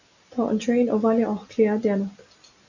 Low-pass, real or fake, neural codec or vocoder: 7.2 kHz; real; none